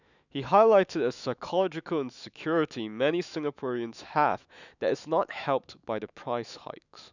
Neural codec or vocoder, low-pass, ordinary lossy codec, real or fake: autoencoder, 48 kHz, 128 numbers a frame, DAC-VAE, trained on Japanese speech; 7.2 kHz; none; fake